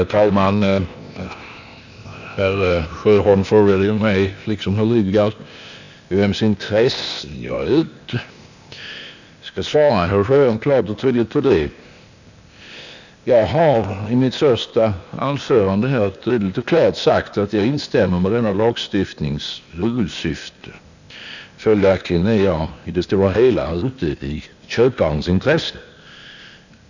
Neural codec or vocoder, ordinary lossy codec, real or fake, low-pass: codec, 16 kHz, 0.8 kbps, ZipCodec; none; fake; 7.2 kHz